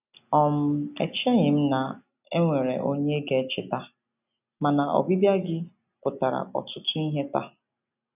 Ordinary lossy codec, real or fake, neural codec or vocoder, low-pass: none; real; none; 3.6 kHz